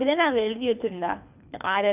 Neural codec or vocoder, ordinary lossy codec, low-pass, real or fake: codec, 24 kHz, 3 kbps, HILCodec; none; 3.6 kHz; fake